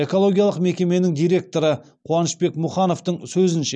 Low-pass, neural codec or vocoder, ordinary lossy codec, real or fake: none; none; none; real